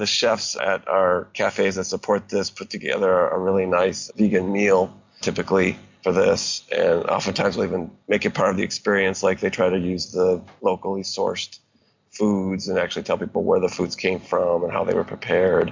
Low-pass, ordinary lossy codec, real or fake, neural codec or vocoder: 7.2 kHz; MP3, 64 kbps; real; none